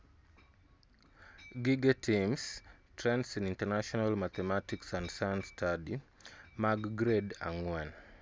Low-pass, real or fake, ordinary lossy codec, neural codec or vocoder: none; real; none; none